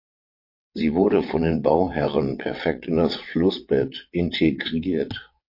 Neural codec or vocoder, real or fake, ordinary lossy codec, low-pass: vocoder, 22.05 kHz, 80 mel bands, WaveNeXt; fake; MP3, 32 kbps; 5.4 kHz